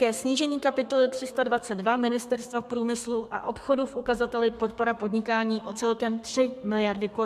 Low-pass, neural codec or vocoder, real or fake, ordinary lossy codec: 14.4 kHz; codec, 32 kHz, 1.9 kbps, SNAC; fake; MP3, 96 kbps